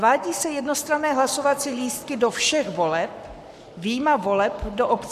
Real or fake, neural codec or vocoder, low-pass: fake; codec, 44.1 kHz, 7.8 kbps, Pupu-Codec; 14.4 kHz